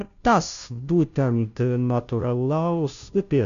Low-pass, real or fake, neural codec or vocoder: 7.2 kHz; fake; codec, 16 kHz, 0.5 kbps, FunCodec, trained on Chinese and English, 25 frames a second